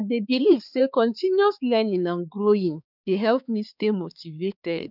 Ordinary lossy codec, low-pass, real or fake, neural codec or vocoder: none; 5.4 kHz; fake; codec, 16 kHz, 4 kbps, X-Codec, HuBERT features, trained on balanced general audio